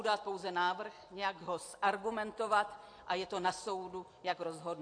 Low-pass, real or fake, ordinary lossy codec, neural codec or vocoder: 9.9 kHz; real; AAC, 48 kbps; none